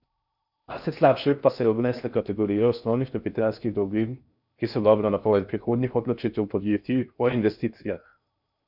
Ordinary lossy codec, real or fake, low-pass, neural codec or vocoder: none; fake; 5.4 kHz; codec, 16 kHz in and 24 kHz out, 0.6 kbps, FocalCodec, streaming, 4096 codes